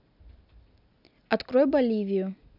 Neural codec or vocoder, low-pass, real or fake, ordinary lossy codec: none; 5.4 kHz; real; none